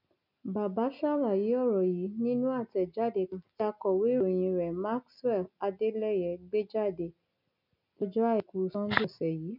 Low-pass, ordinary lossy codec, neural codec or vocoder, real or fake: 5.4 kHz; none; none; real